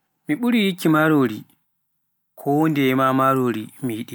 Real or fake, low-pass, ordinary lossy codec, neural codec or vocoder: real; none; none; none